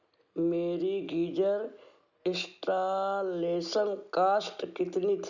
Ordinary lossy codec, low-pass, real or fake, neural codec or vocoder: none; 7.2 kHz; real; none